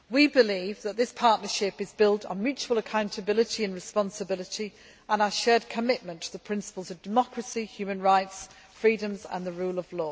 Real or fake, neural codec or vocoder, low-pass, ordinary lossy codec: real; none; none; none